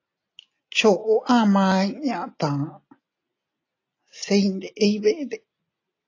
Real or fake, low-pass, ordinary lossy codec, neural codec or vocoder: real; 7.2 kHz; AAC, 32 kbps; none